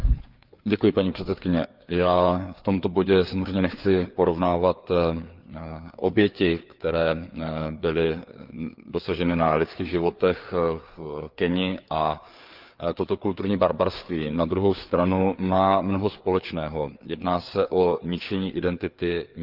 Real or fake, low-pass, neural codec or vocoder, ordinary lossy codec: fake; 5.4 kHz; codec, 16 kHz, 4 kbps, FreqCodec, larger model; Opus, 32 kbps